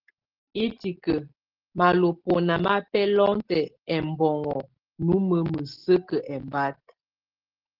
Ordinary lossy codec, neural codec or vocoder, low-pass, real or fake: Opus, 16 kbps; none; 5.4 kHz; real